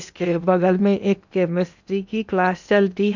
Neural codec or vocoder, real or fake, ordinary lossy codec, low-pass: codec, 16 kHz in and 24 kHz out, 0.8 kbps, FocalCodec, streaming, 65536 codes; fake; none; 7.2 kHz